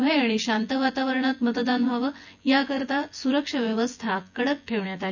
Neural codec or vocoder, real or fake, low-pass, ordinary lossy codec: vocoder, 24 kHz, 100 mel bands, Vocos; fake; 7.2 kHz; none